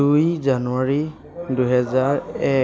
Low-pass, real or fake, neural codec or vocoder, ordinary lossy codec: none; real; none; none